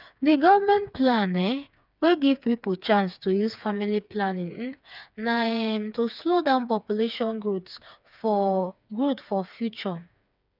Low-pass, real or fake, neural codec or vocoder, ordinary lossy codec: 5.4 kHz; fake; codec, 16 kHz, 4 kbps, FreqCodec, smaller model; none